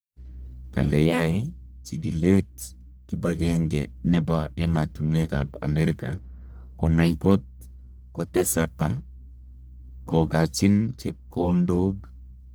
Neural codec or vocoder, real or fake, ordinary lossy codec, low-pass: codec, 44.1 kHz, 1.7 kbps, Pupu-Codec; fake; none; none